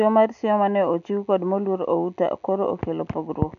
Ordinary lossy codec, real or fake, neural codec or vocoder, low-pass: none; real; none; 7.2 kHz